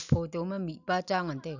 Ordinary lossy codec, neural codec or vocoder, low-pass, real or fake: none; none; 7.2 kHz; real